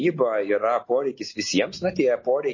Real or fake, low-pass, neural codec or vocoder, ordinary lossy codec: real; 7.2 kHz; none; MP3, 32 kbps